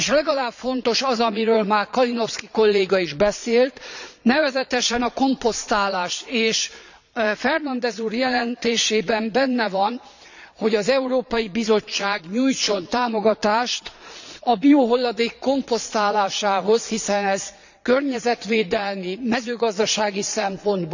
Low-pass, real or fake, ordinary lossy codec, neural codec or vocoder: 7.2 kHz; fake; none; vocoder, 22.05 kHz, 80 mel bands, Vocos